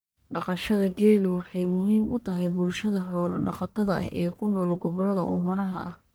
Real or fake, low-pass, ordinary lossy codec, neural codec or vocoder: fake; none; none; codec, 44.1 kHz, 1.7 kbps, Pupu-Codec